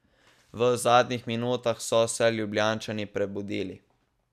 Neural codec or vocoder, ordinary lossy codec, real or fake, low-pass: none; none; real; 14.4 kHz